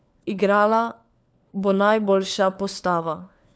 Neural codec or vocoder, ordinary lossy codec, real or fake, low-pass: codec, 16 kHz, 4 kbps, FunCodec, trained on LibriTTS, 50 frames a second; none; fake; none